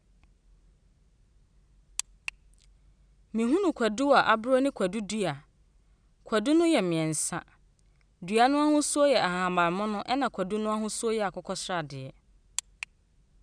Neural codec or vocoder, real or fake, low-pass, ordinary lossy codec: none; real; 9.9 kHz; none